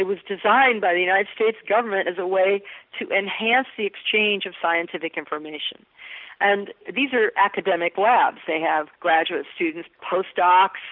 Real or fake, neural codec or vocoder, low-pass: real; none; 5.4 kHz